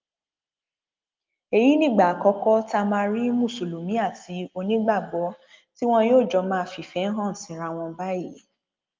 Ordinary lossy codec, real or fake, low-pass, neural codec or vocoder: Opus, 24 kbps; real; 7.2 kHz; none